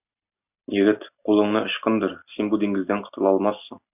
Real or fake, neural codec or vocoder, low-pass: real; none; 3.6 kHz